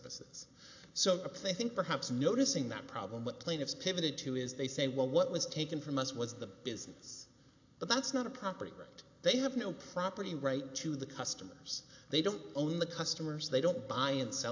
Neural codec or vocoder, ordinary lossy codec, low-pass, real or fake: none; AAC, 48 kbps; 7.2 kHz; real